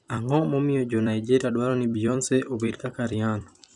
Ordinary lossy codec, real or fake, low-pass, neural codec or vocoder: none; fake; 10.8 kHz; vocoder, 44.1 kHz, 128 mel bands every 256 samples, BigVGAN v2